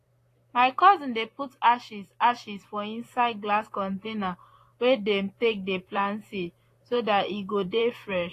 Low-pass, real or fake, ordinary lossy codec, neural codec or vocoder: 14.4 kHz; fake; AAC, 48 kbps; vocoder, 44.1 kHz, 128 mel bands, Pupu-Vocoder